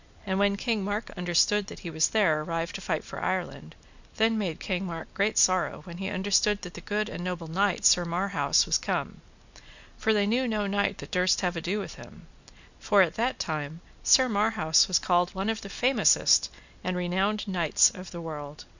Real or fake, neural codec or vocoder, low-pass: real; none; 7.2 kHz